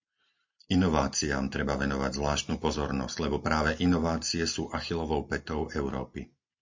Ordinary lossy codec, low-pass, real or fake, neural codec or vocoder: MP3, 48 kbps; 7.2 kHz; real; none